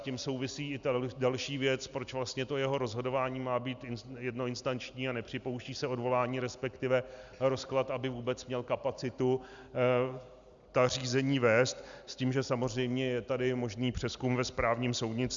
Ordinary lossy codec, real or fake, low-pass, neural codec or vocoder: Opus, 64 kbps; real; 7.2 kHz; none